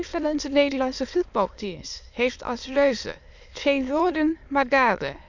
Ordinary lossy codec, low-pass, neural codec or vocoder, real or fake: none; 7.2 kHz; autoencoder, 22.05 kHz, a latent of 192 numbers a frame, VITS, trained on many speakers; fake